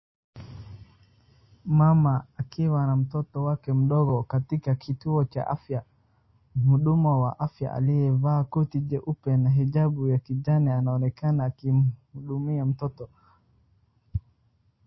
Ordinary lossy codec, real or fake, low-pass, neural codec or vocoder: MP3, 24 kbps; real; 7.2 kHz; none